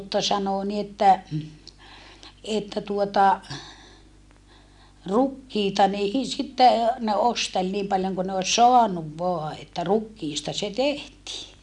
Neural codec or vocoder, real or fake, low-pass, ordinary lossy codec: none; real; 10.8 kHz; none